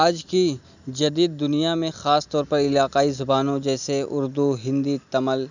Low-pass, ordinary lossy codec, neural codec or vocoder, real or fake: 7.2 kHz; none; none; real